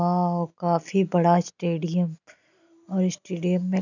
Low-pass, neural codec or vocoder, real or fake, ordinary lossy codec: 7.2 kHz; none; real; none